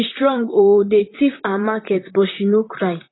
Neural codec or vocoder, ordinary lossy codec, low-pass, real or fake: vocoder, 44.1 kHz, 128 mel bands, Pupu-Vocoder; AAC, 16 kbps; 7.2 kHz; fake